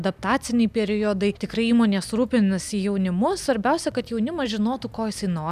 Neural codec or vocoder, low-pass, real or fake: none; 14.4 kHz; real